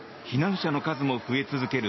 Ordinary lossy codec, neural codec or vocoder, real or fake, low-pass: MP3, 24 kbps; none; real; 7.2 kHz